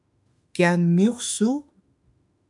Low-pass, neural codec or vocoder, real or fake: 10.8 kHz; autoencoder, 48 kHz, 32 numbers a frame, DAC-VAE, trained on Japanese speech; fake